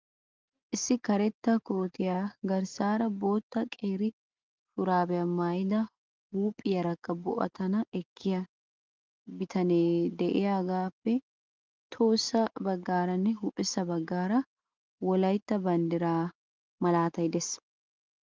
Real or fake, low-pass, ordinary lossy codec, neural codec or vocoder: real; 7.2 kHz; Opus, 32 kbps; none